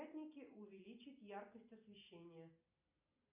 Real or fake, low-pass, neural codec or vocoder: real; 3.6 kHz; none